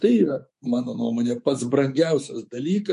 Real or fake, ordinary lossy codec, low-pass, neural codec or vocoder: fake; MP3, 48 kbps; 10.8 kHz; codec, 24 kHz, 3.1 kbps, DualCodec